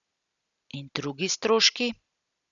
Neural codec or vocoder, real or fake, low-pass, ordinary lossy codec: none; real; 7.2 kHz; none